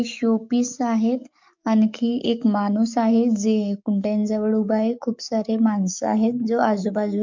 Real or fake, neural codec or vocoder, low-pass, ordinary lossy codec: fake; codec, 44.1 kHz, 7.8 kbps, DAC; 7.2 kHz; MP3, 64 kbps